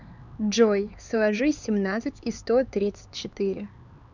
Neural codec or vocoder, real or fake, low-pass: codec, 16 kHz, 4 kbps, X-Codec, HuBERT features, trained on LibriSpeech; fake; 7.2 kHz